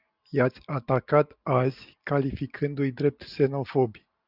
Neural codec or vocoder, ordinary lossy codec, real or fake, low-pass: none; Opus, 64 kbps; real; 5.4 kHz